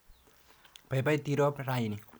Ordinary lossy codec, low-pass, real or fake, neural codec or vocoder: none; none; real; none